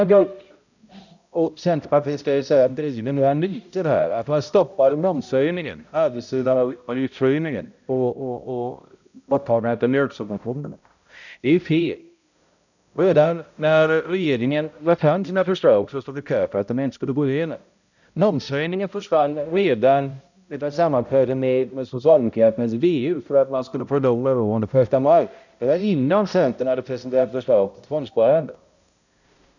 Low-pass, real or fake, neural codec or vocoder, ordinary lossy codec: 7.2 kHz; fake; codec, 16 kHz, 0.5 kbps, X-Codec, HuBERT features, trained on balanced general audio; none